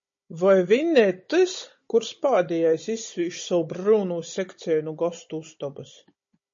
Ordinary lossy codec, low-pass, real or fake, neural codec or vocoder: MP3, 32 kbps; 7.2 kHz; fake; codec, 16 kHz, 16 kbps, FunCodec, trained on Chinese and English, 50 frames a second